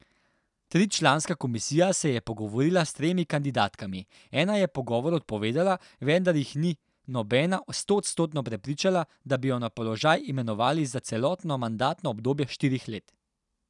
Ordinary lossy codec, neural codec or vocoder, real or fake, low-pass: none; none; real; 10.8 kHz